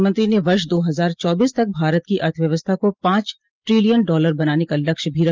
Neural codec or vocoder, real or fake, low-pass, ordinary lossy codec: none; real; 7.2 kHz; Opus, 32 kbps